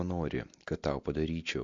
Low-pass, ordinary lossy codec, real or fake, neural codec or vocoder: 7.2 kHz; MP3, 48 kbps; real; none